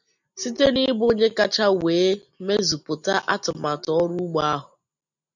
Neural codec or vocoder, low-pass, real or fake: none; 7.2 kHz; real